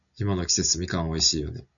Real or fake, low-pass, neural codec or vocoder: real; 7.2 kHz; none